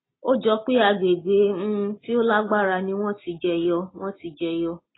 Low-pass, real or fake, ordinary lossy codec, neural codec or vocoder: 7.2 kHz; real; AAC, 16 kbps; none